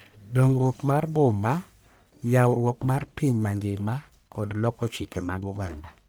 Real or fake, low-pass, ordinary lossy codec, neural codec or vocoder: fake; none; none; codec, 44.1 kHz, 1.7 kbps, Pupu-Codec